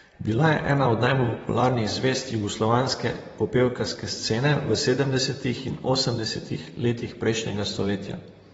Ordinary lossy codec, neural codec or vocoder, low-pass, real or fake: AAC, 24 kbps; vocoder, 44.1 kHz, 128 mel bands, Pupu-Vocoder; 19.8 kHz; fake